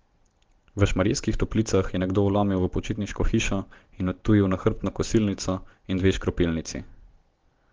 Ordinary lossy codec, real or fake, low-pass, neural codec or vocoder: Opus, 16 kbps; real; 7.2 kHz; none